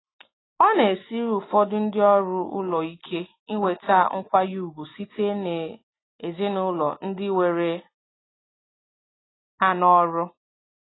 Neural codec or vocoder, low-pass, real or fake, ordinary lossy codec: none; 7.2 kHz; real; AAC, 16 kbps